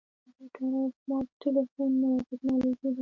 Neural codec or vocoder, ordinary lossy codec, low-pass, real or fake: none; MP3, 48 kbps; 7.2 kHz; real